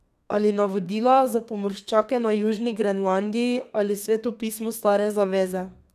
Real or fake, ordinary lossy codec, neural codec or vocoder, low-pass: fake; none; codec, 32 kHz, 1.9 kbps, SNAC; 14.4 kHz